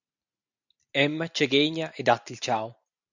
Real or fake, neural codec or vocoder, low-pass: real; none; 7.2 kHz